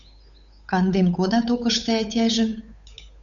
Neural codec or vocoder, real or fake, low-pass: codec, 16 kHz, 8 kbps, FunCodec, trained on Chinese and English, 25 frames a second; fake; 7.2 kHz